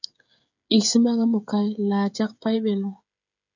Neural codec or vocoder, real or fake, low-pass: codec, 16 kHz, 16 kbps, FreqCodec, smaller model; fake; 7.2 kHz